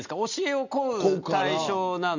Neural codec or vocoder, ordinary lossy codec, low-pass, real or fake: none; none; 7.2 kHz; real